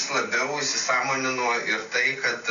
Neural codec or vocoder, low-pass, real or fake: none; 7.2 kHz; real